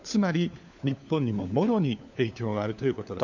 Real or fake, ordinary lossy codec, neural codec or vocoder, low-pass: fake; none; codec, 16 kHz, 4 kbps, FunCodec, trained on LibriTTS, 50 frames a second; 7.2 kHz